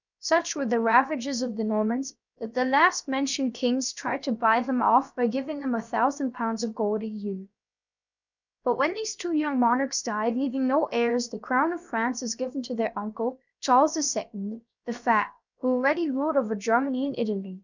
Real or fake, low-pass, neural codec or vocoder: fake; 7.2 kHz; codec, 16 kHz, about 1 kbps, DyCAST, with the encoder's durations